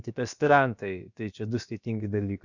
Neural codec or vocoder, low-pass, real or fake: codec, 16 kHz, about 1 kbps, DyCAST, with the encoder's durations; 7.2 kHz; fake